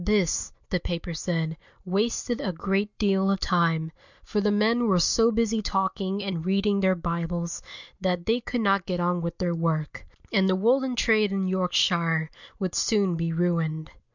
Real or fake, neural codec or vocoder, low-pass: real; none; 7.2 kHz